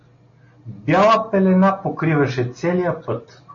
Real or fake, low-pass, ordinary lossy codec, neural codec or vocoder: real; 7.2 kHz; MP3, 32 kbps; none